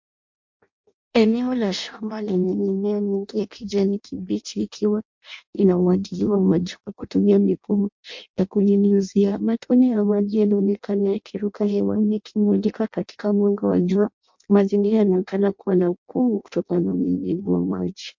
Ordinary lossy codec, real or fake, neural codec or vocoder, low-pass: MP3, 48 kbps; fake; codec, 16 kHz in and 24 kHz out, 0.6 kbps, FireRedTTS-2 codec; 7.2 kHz